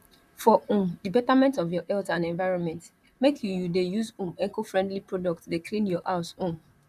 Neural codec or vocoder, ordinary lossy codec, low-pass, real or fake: vocoder, 44.1 kHz, 128 mel bands, Pupu-Vocoder; none; 14.4 kHz; fake